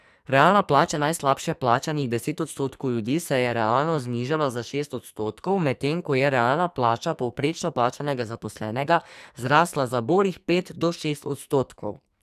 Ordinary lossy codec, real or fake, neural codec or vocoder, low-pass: none; fake; codec, 44.1 kHz, 2.6 kbps, SNAC; 14.4 kHz